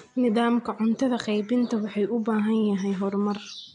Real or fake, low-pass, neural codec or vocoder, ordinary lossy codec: real; 9.9 kHz; none; none